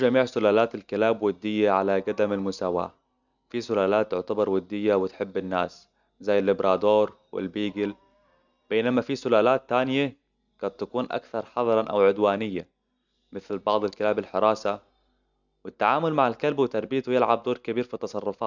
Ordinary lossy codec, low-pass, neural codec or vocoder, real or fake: none; 7.2 kHz; none; real